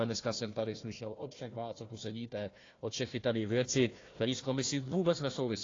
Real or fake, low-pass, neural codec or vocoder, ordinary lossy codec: fake; 7.2 kHz; codec, 16 kHz, 1 kbps, FunCodec, trained on Chinese and English, 50 frames a second; AAC, 32 kbps